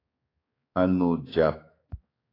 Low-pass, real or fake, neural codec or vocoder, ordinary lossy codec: 5.4 kHz; fake; codec, 16 kHz, 4 kbps, X-Codec, HuBERT features, trained on balanced general audio; AAC, 24 kbps